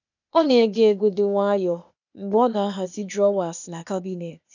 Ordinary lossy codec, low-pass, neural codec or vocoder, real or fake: none; 7.2 kHz; codec, 16 kHz, 0.8 kbps, ZipCodec; fake